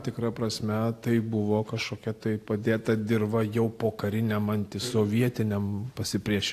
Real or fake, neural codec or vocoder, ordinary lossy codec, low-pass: real; none; AAC, 64 kbps; 14.4 kHz